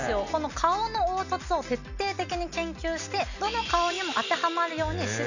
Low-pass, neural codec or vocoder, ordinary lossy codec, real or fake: 7.2 kHz; none; none; real